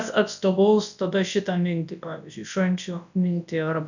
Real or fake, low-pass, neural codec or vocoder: fake; 7.2 kHz; codec, 24 kHz, 0.9 kbps, WavTokenizer, large speech release